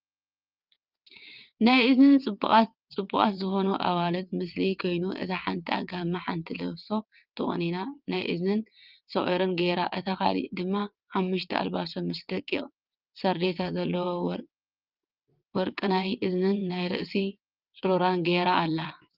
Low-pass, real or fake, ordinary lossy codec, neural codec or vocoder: 5.4 kHz; fake; Opus, 24 kbps; vocoder, 22.05 kHz, 80 mel bands, WaveNeXt